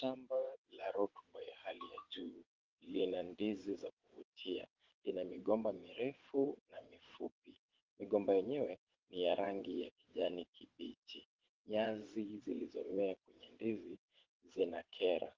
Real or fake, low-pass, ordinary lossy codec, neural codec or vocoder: fake; 7.2 kHz; Opus, 16 kbps; vocoder, 22.05 kHz, 80 mel bands, WaveNeXt